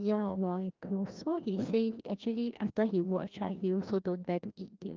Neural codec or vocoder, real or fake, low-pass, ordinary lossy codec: codec, 16 kHz, 1 kbps, FreqCodec, larger model; fake; 7.2 kHz; Opus, 24 kbps